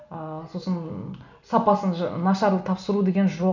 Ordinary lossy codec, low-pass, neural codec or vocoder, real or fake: none; 7.2 kHz; none; real